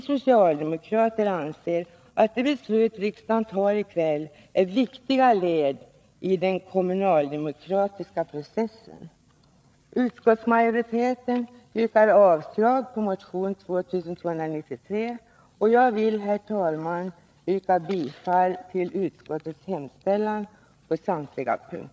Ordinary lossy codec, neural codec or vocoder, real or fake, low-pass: none; codec, 16 kHz, 8 kbps, FreqCodec, larger model; fake; none